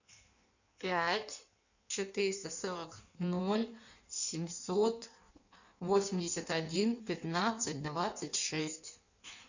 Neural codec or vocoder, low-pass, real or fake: codec, 16 kHz in and 24 kHz out, 1.1 kbps, FireRedTTS-2 codec; 7.2 kHz; fake